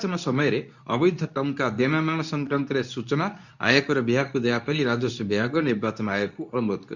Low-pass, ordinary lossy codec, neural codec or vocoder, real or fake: 7.2 kHz; none; codec, 24 kHz, 0.9 kbps, WavTokenizer, medium speech release version 2; fake